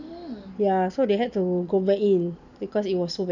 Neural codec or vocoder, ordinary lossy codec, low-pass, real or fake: none; none; 7.2 kHz; real